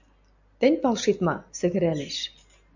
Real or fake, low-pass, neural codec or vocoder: real; 7.2 kHz; none